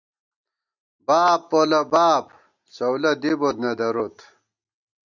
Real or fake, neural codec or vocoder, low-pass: real; none; 7.2 kHz